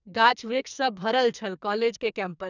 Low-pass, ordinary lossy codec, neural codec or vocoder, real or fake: 7.2 kHz; none; codec, 44.1 kHz, 2.6 kbps, SNAC; fake